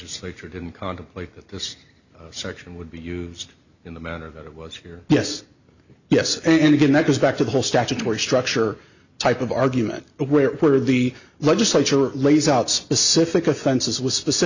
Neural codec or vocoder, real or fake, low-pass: none; real; 7.2 kHz